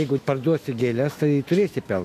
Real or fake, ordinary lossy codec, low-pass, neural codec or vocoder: fake; AAC, 64 kbps; 14.4 kHz; autoencoder, 48 kHz, 128 numbers a frame, DAC-VAE, trained on Japanese speech